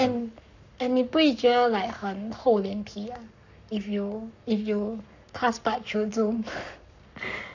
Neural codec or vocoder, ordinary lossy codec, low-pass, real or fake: codec, 44.1 kHz, 7.8 kbps, Pupu-Codec; none; 7.2 kHz; fake